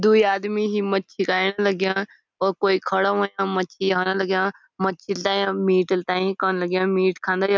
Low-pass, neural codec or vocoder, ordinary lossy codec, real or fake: none; none; none; real